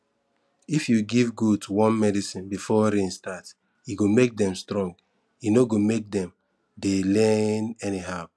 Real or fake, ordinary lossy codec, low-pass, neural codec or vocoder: real; none; none; none